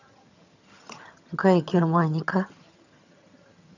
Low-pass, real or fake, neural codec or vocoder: 7.2 kHz; fake; vocoder, 22.05 kHz, 80 mel bands, HiFi-GAN